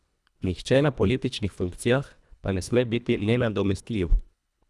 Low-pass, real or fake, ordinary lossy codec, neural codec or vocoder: none; fake; none; codec, 24 kHz, 1.5 kbps, HILCodec